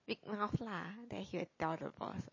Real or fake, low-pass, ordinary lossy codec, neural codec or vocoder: real; 7.2 kHz; MP3, 32 kbps; none